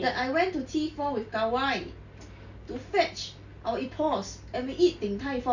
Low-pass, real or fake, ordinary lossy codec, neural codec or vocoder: 7.2 kHz; real; none; none